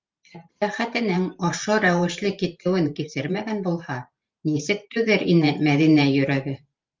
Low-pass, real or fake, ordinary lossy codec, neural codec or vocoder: 7.2 kHz; fake; Opus, 32 kbps; vocoder, 44.1 kHz, 128 mel bands every 512 samples, BigVGAN v2